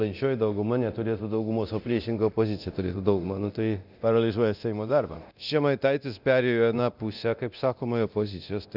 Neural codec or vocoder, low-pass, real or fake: codec, 24 kHz, 0.9 kbps, DualCodec; 5.4 kHz; fake